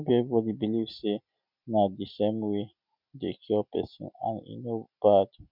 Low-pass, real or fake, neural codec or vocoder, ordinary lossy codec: 5.4 kHz; real; none; none